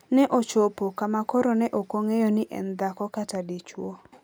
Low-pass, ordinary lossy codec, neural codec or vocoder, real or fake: none; none; none; real